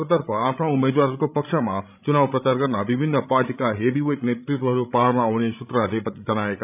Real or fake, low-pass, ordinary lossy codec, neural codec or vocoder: fake; 3.6 kHz; none; codec, 16 kHz, 16 kbps, FreqCodec, larger model